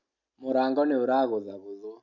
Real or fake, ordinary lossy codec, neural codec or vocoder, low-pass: real; none; none; 7.2 kHz